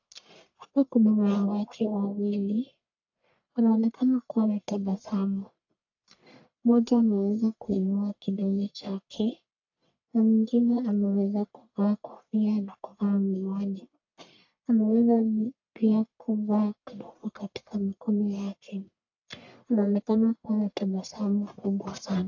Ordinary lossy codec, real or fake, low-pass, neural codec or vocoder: AAC, 48 kbps; fake; 7.2 kHz; codec, 44.1 kHz, 1.7 kbps, Pupu-Codec